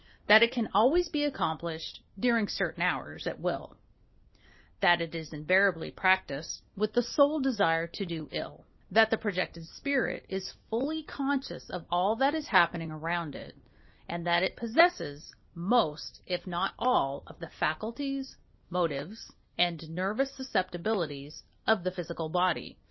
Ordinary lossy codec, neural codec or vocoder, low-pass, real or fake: MP3, 24 kbps; none; 7.2 kHz; real